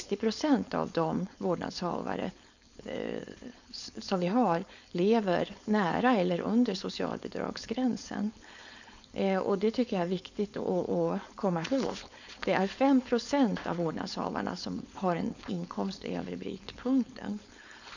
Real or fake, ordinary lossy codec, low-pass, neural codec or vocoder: fake; none; 7.2 kHz; codec, 16 kHz, 4.8 kbps, FACodec